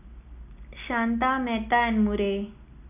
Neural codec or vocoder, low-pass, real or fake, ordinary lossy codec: none; 3.6 kHz; real; none